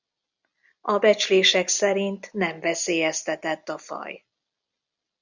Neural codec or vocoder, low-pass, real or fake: none; 7.2 kHz; real